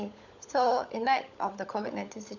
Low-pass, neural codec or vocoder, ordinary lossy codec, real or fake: 7.2 kHz; codec, 16 kHz, 16 kbps, FunCodec, trained on LibriTTS, 50 frames a second; none; fake